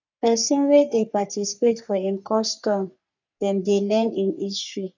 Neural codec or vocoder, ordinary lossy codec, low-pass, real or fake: codec, 44.1 kHz, 3.4 kbps, Pupu-Codec; none; 7.2 kHz; fake